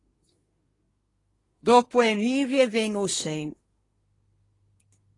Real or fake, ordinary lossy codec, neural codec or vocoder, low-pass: fake; AAC, 32 kbps; codec, 24 kHz, 1 kbps, SNAC; 10.8 kHz